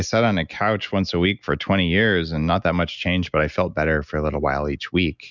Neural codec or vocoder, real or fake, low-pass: none; real; 7.2 kHz